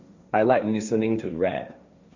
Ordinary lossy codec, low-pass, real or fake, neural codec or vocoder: Opus, 64 kbps; 7.2 kHz; fake; codec, 16 kHz, 1.1 kbps, Voila-Tokenizer